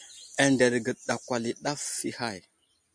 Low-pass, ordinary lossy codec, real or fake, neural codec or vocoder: 9.9 kHz; MP3, 64 kbps; real; none